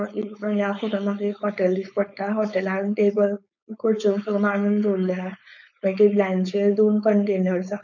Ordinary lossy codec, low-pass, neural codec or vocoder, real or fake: none; 7.2 kHz; codec, 16 kHz, 4.8 kbps, FACodec; fake